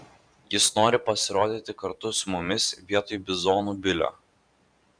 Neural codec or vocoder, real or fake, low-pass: vocoder, 44.1 kHz, 128 mel bands every 512 samples, BigVGAN v2; fake; 9.9 kHz